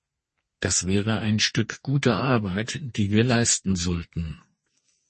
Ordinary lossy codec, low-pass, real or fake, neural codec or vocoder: MP3, 32 kbps; 10.8 kHz; fake; codec, 32 kHz, 1.9 kbps, SNAC